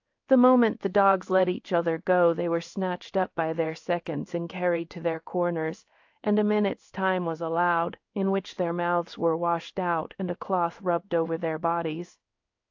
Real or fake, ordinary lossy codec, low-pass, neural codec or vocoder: fake; AAC, 48 kbps; 7.2 kHz; codec, 16 kHz in and 24 kHz out, 1 kbps, XY-Tokenizer